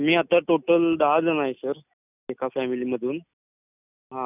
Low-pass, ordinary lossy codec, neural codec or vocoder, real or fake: 3.6 kHz; none; none; real